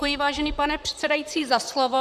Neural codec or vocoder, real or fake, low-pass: vocoder, 44.1 kHz, 128 mel bands, Pupu-Vocoder; fake; 14.4 kHz